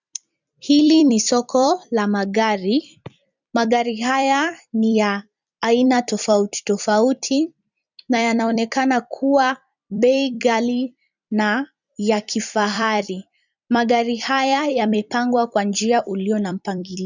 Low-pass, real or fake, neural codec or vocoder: 7.2 kHz; fake; vocoder, 44.1 kHz, 128 mel bands every 256 samples, BigVGAN v2